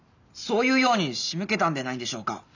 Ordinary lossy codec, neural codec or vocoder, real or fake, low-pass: none; none; real; 7.2 kHz